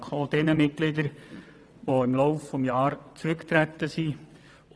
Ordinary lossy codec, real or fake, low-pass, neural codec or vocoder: none; fake; none; vocoder, 22.05 kHz, 80 mel bands, WaveNeXt